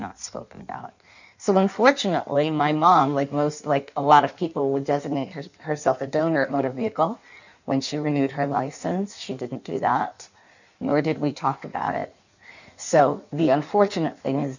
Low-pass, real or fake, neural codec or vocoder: 7.2 kHz; fake; codec, 16 kHz in and 24 kHz out, 1.1 kbps, FireRedTTS-2 codec